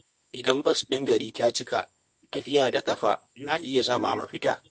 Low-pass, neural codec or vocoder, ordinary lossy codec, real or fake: 10.8 kHz; codec, 24 kHz, 0.9 kbps, WavTokenizer, medium music audio release; MP3, 48 kbps; fake